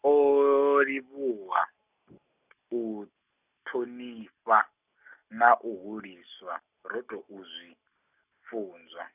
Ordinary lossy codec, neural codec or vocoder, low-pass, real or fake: none; none; 3.6 kHz; real